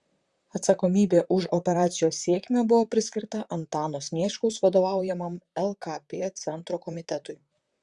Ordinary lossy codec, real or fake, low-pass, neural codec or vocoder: Opus, 64 kbps; fake; 10.8 kHz; vocoder, 44.1 kHz, 128 mel bands, Pupu-Vocoder